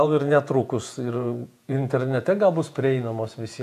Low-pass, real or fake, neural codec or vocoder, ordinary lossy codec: 14.4 kHz; fake; vocoder, 48 kHz, 128 mel bands, Vocos; AAC, 96 kbps